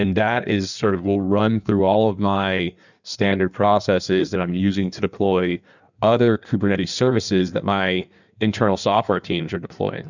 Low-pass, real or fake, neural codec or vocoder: 7.2 kHz; fake; codec, 16 kHz in and 24 kHz out, 1.1 kbps, FireRedTTS-2 codec